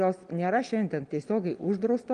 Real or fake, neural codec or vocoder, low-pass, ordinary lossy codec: real; none; 9.9 kHz; Opus, 24 kbps